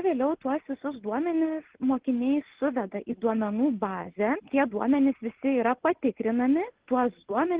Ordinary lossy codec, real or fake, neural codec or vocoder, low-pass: Opus, 16 kbps; real; none; 3.6 kHz